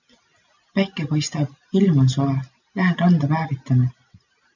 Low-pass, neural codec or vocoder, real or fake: 7.2 kHz; none; real